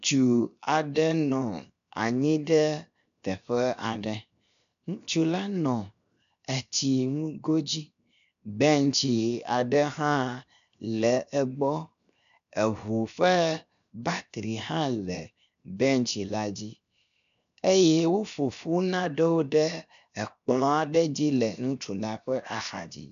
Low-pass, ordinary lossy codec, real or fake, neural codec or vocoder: 7.2 kHz; MP3, 96 kbps; fake; codec, 16 kHz, 0.7 kbps, FocalCodec